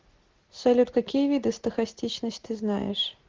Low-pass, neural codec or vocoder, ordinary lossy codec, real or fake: 7.2 kHz; none; Opus, 16 kbps; real